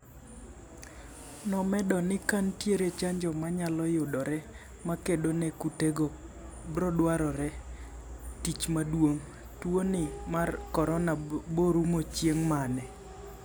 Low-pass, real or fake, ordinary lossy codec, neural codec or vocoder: none; real; none; none